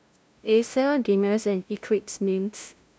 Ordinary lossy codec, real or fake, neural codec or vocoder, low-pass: none; fake; codec, 16 kHz, 0.5 kbps, FunCodec, trained on LibriTTS, 25 frames a second; none